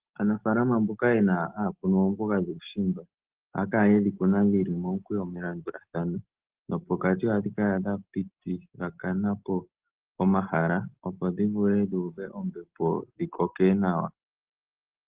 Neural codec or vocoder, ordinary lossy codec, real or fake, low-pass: none; Opus, 16 kbps; real; 3.6 kHz